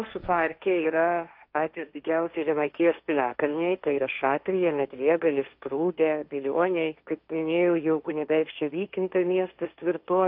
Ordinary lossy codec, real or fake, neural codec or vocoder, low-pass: MP3, 48 kbps; fake; codec, 16 kHz, 1.1 kbps, Voila-Tokenizer; 5.4 kHz